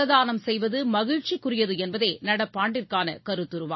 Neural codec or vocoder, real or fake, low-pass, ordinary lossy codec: none; real; 7.2 kHz; MP3, 24 kbps